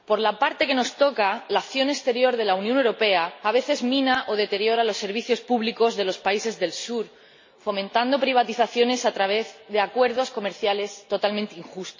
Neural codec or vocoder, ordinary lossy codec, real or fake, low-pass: none; MP3, 32 kbps; real; 7.2 kHz